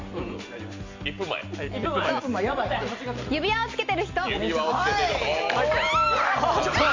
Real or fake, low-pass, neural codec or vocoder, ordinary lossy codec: real; 7.2 kHz; none; MP3, 48 kbps